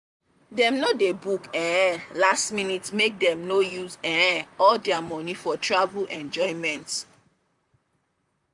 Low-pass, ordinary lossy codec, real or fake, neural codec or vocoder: 10.8 kHz; none; fake; vocoder, 44.1 kHz, 128 mel bands, Pupu-Vocoder